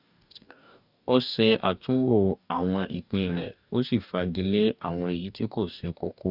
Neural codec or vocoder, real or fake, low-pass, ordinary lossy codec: codec, 44.1 kHz, 2.6 kbps, DAC; fake; 5.4 kHz; none